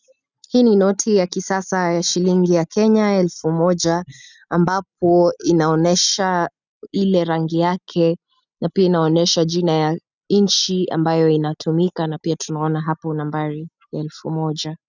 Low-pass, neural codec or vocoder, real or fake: 7.2 kHz; none; real